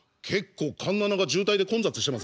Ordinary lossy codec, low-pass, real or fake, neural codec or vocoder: none; none; real; none